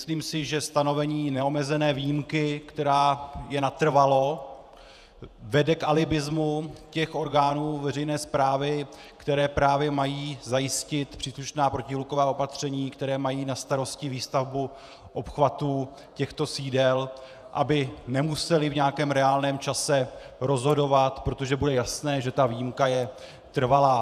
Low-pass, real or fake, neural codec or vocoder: 14.4 kHz; fake; vocoder, 48 kHz, 128 mel bands, Vocos